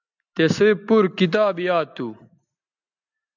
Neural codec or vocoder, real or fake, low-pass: none; real; 7.2 kHz